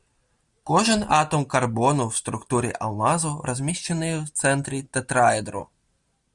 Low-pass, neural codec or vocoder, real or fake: 10.8 kHz; none; real